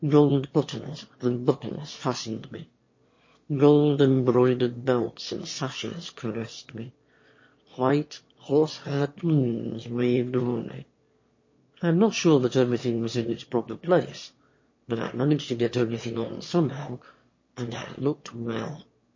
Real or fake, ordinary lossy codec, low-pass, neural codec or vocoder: fake; MP3, 32 kbps; 7.2 kHz; autoencoder, 22.05 kHz, a latent of 192 numbers a frame, VITS, trained on one speaker